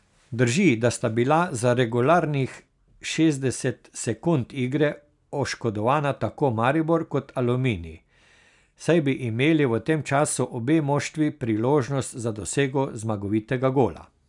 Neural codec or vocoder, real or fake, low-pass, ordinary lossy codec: none; real; 10.8 kHz; none